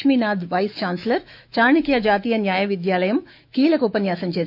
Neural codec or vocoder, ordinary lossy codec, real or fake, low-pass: autoencoder, 48 kHz, 128 numbers a frame, DAC-VAE, trained on Japanese speech; none; fake; 5.4 kHz